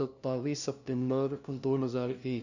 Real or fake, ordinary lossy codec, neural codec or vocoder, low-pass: fake; none; codec, 16 kHz, 0.5 kbps, FunCodec, trained on LibriTTS, 25 frames a second; 7.2 kHz